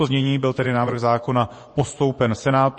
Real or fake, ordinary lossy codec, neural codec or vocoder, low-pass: fake; MP3, 32 kbps; vocoder, 24 kHz, 100 mel bands, Vocos; 10.8 kHz